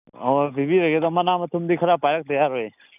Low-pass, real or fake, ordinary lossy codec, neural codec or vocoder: 3.6 kHz; real; none; none